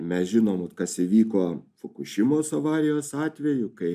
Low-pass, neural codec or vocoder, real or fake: 14.4 kHz; none; real